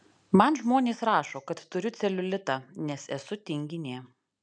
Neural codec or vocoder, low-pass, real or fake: none; 9.9 kHz; real